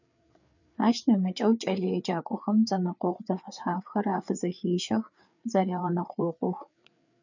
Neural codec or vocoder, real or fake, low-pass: codec, 16 kHz, 4 kbps, FreqCodec, larger model; fake; 7.2 kHz